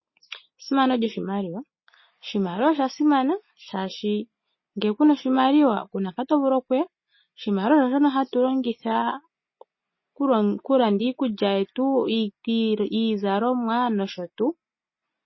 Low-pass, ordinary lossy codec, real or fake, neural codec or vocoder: 7.2 kHz; MP3, 24 kbps; real; none